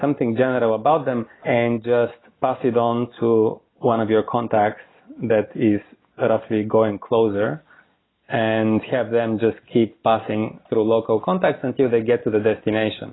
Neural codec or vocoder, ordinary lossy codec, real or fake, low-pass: none; AAC, 16 kbps; real; 7.2 kHz